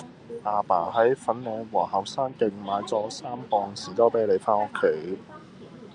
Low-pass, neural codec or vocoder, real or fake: 9.9 kHz; none; real